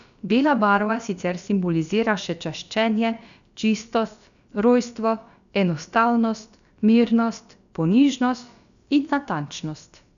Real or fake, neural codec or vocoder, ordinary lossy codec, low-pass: fake; codec, 16 kHz, about 1 kbps, DyCAST, with the encoder's durations; none; 7.2 kHz